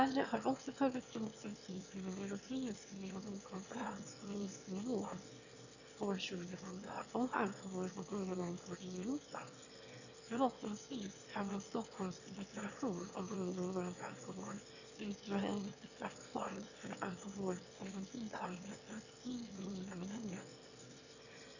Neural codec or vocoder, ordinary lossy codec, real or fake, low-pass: autoencoder, 22.05 kHz, a latent of 192 numbers a frame, VITS, trained on one speaker; none; fake; 7.2 kHz